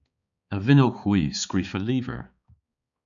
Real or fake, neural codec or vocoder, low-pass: fake; codec, 16 kHz, 4 kbps, X-Codec, HuBERT features, trained on balanced general audio; 7.2 kHz